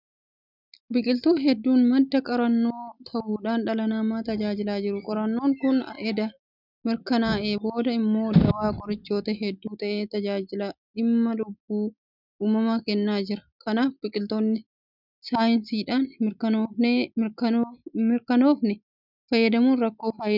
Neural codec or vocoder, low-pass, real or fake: none; 5.4 kHz; real